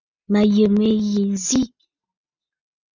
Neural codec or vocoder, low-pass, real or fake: none; 7.2 kHz; real